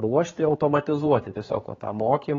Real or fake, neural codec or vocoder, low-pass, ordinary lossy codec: fake; codec, 16 kHz, 4 kbps, X-Codec, HuBERT features, trained on general audio; 7.2 kHz; AAC, 24 kbps